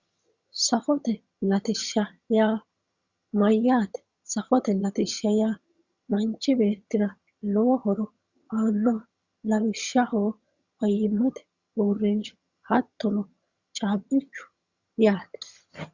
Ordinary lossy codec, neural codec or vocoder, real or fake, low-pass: Opus, 64 kbps; vocoder, 22.05 kHz, 80 mel bands, HiFi-GAN; fake; 7.2 kHz